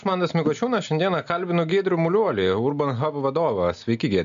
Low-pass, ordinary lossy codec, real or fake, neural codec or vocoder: 7.2 kHz; MP3, 64 kbps; real; none